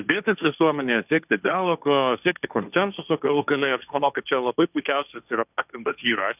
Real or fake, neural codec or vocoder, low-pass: fake; codec, 16 kHz, 1.1 kbps, Voila-Tokenizer; 3.6 kHz